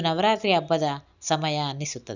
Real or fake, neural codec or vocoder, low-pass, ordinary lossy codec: real; none; 7.2 kHz; none